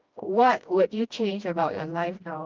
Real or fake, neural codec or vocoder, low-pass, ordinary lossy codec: fake; codec, 16 kHz, 1 kbps, FreqCodec, smaller model; 7.2 kHz; Opus, 24 kbps